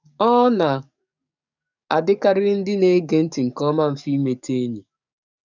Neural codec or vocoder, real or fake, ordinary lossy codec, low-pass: codec, 44.1 kHz, 7.8 kbps, DAC; fake; none; 7.2 kHz